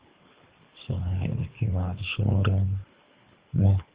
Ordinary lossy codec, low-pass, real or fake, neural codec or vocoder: Opus, 32 kbps; 3.6 kHz; fake; codec, 24 kHz, 3 kbps, HILCodec